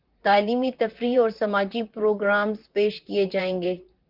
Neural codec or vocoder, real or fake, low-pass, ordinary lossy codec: vocoder, 44.1 kHz, 128 mel bands, Pupu-Vocoder; fake; 5.4 kHz; Opus, 32 kbps